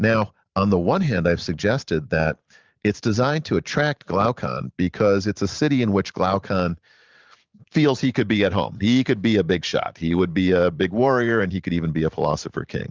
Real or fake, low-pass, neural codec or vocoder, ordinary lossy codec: fake; 7.2 kHz; vocoder, 44.1 kHz, 128 mel bands every 512 samples, BigVGAN v2; Opus, 32 kbps